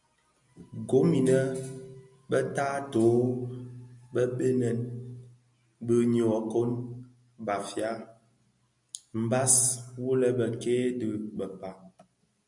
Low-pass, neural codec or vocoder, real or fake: 10.8 kHz; none; real